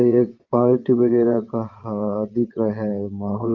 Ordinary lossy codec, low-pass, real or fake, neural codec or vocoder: Opus, 32 kbps; 7.2 kHz; fake; vocoder, 22.05 kHz, 80 mel bands, WaveNeXt